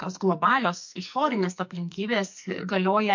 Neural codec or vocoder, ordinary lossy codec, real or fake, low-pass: codec, 44.1 kHz, 2.6 kbps, SNAC; MP3, 48 kbps; fake; 7.2 kHz